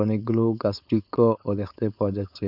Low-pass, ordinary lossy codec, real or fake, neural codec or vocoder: 5.4 kHz; none; fake; codec, 16 kHz, 4.8 kbps, FACodec